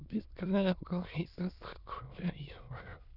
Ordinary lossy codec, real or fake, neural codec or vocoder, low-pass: none; fake; autoencoder, 22.05 kHz, a latent of 192 numbers a frame, VITS, trained on many speakers; 5.4 kHz